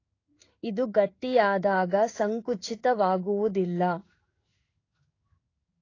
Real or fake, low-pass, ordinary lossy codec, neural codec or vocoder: fake; 7.2 kHz; AAC, 32 kbps; codec, 16 kHz in and 24 kHz out, 1 kbps, XY-Tokenizer